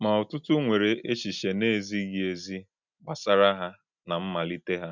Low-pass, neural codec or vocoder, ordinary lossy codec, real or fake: 7.2 kHz; none; none; real